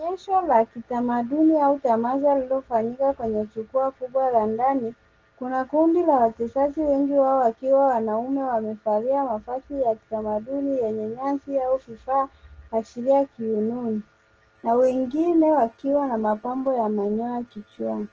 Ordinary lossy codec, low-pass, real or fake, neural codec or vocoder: Opus, 16 kbps; 7.2 kHz; real; none